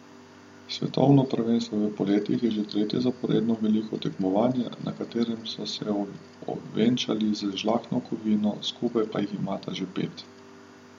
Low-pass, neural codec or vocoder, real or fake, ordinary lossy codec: 19.8 kHz; none; real; MP3, 64 kbps